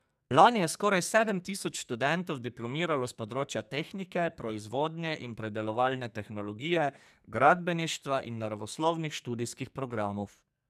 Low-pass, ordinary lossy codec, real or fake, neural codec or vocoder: 14.4 kHz; none; fake; codec, 44.1 kHz, 2.6 kbps, SNAC